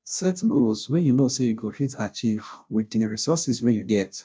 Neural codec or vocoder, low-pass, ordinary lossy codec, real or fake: codec, 16 kHz, 0.5 kbps, FunCodec, trained on Chinese and English, 25 frames a second; none; none; fake